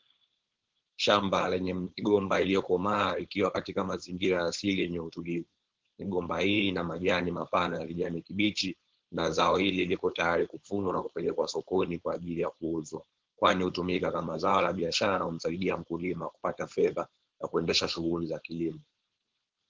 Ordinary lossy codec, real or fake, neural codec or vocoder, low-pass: Opus, 16 kbps; fake; codec, 16 kHz, 4.8 kbps, FACodec; 7.2 kHz